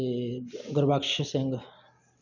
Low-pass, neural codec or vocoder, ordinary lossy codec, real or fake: 7.2 kHz; none; none; real